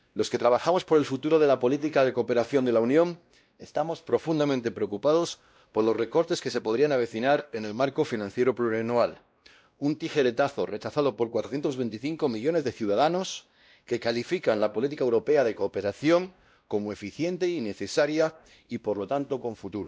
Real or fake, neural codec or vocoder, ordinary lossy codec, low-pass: fake; codec, 16 kHz, 1 kbps, X-Codec, WavLM features, trained on Multilingual LibriSpeech; none; none